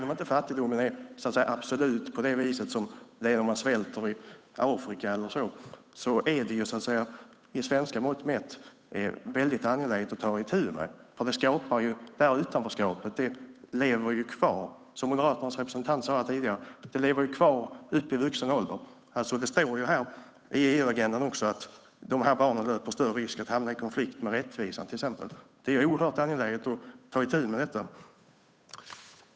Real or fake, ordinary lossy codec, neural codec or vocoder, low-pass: fake; none; codec, 16 kHz, 8 kbps, FunCodec, trained on Chinese and English, 25 frames a second; none